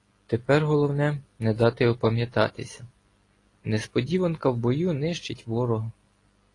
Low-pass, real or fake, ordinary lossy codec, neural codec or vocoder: 10.8 kHz; real; AAC, 32 kbps; none